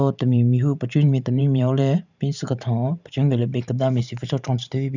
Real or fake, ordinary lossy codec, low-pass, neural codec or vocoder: fake; none; 7.2 kHz; vocoder, 44.1 kHz, 128 mel bands every 512 samples, BigVGAN v2